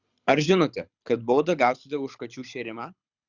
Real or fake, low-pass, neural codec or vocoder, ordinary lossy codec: fake; 7.2 kHz; codec, 24 kHz, 6 kbps, HILCodec; Opus, 64 kbps